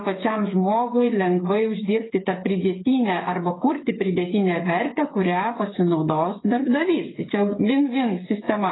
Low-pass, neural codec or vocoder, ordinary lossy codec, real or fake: 7.2 kHz; codec, 16 kHz, 8 kbps, FreqCodec, smaller model; AAC, 16 kbps; fake